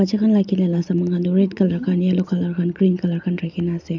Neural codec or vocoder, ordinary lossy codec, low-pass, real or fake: none; none; 7.2 kHz; real